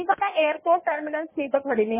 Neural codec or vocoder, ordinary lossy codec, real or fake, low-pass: codec, 16 kHz in and 24 kHz out, 1.1 kbps, FireRedTTS-2 codec; MP3, 16 kbps; fake; 3.6 kHz